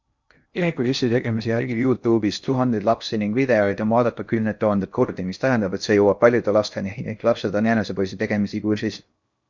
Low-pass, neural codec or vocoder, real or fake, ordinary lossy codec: 7.2 kHz; codec, 16 kHz in and 24 kHz out, 0.6 kbps, FocalCodec, streaming, 2048 codes; fake; Opus, 64 kbps